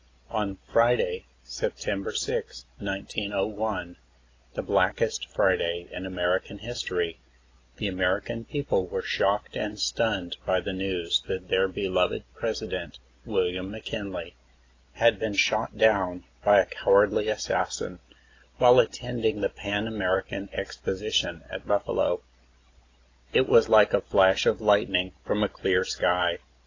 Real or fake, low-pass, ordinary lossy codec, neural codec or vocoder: real; 7.2 kHz; AAC, 32 kbps; none